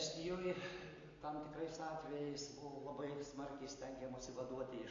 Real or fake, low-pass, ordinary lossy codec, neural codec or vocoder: real; 7.2 kHz; AAC, 32 kbps; none